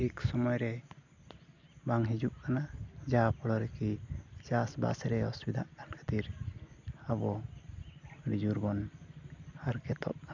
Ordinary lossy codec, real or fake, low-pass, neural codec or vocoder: none; real; 7.2 kHz; none